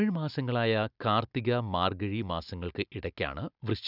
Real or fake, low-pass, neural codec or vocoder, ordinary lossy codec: real; 5.4 kHz; none; none